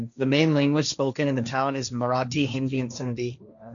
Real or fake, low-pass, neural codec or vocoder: fake; 7.2 kHz; codec, 16 kHz, 1.1 kbps, Voila-Tokenizer